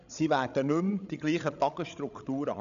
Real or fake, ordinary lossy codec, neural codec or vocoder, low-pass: fake; MP3, 96 kbps; codec, 16 kHz, 16 kbps, FreqCodec, larger model; 7.2 kHz